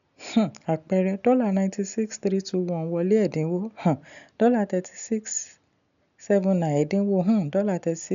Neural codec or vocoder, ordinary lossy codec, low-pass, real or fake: none; none; 7.2 kHz; real